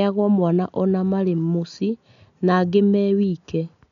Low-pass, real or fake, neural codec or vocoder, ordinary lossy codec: 7.2 kHz; real; none; none